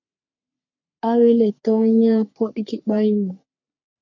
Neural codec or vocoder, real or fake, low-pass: codec, 44.1 kHz, 3.4 kbps, Pupu-Codec; fake; 7.2 kHz